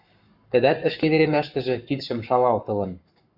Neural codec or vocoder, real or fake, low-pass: codec, 44.1 kHz, 7.8 kbps, Pupu-Codec; fake; 5.4 kHz